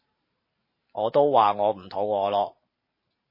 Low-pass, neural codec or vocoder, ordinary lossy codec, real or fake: 5.4 kHz; vocoder, 44.1 kHz, 128 mel bands every 512 samples, BigVGAN v2; MP3, 24 kbps; fake